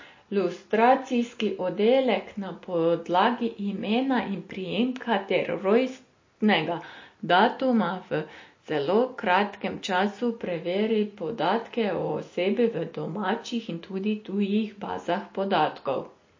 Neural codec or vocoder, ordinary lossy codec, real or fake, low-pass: none; MP3, 32 kbps; real; 7.2 kHz